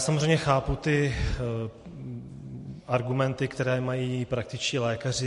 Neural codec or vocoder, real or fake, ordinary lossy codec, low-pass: vocoder, 44.1 kHz, 128 mel bands every 512 samples, BigVGAN v2; fake; MP3, 48 kbps; 14.4 kHz